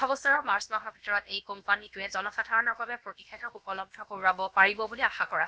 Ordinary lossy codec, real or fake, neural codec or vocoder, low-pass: none; fake; codec, 16 kHz, about 1 kbps, DyCAST, with the encoder's durations; none